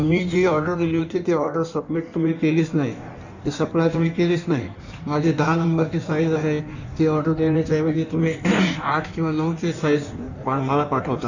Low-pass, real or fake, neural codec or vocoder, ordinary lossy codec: 7.2 kHz; fake; codec, 16 kHz in and 24 kHz out, 1.1 kbps, FireRedTTS-2 codec; none